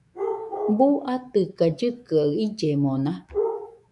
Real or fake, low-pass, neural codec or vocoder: fake; 10.8 kHz; autoencoder, 48 kHz, 128 numbers a frame, DAC-VAE, trained on Japanese speech